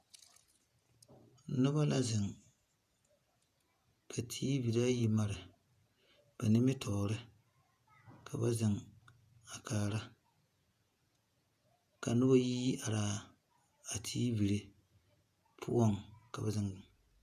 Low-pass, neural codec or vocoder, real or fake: 14.4 kHz; vocoder, 48 kHz, 128 mel bands, Vocos; fake